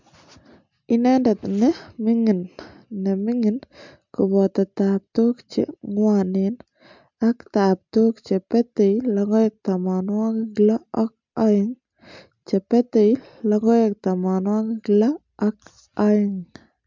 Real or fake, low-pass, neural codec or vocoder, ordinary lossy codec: real; 7.2 kHz; none; MP3, 64 kbps